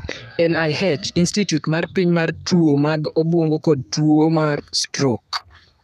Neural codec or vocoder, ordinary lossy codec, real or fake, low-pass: codec, 32 kHz, 1.9 kbps, SNAC; none; fake; 14.4 kHz